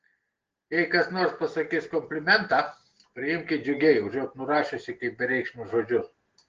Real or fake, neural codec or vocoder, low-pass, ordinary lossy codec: real; none; 7.2 kHz; Opus, 16 kbps